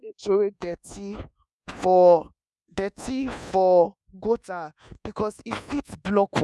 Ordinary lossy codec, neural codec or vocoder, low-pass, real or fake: none; codec, 24 kHz, 1.2 kbps, DualCodec; none; fake